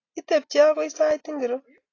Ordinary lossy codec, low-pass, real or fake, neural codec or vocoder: AAC, 32 kbps; 7.2 kHz; real; none